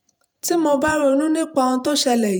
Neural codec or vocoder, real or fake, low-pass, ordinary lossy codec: vocoder, 48 kHz, 128 mel bands, Vocos; fake; none; none